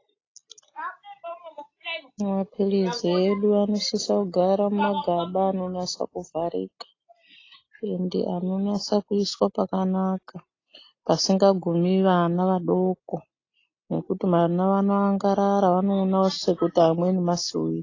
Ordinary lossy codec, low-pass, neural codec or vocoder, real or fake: AAC, 32 kbps; 7.2 kHz; none; real